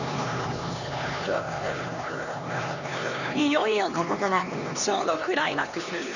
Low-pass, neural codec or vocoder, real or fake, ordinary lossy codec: 7.2 kHz; codec, 16 kHz, 2 kbps, X-Codec, HuBERT features, trained on LibriSpeech; fake; none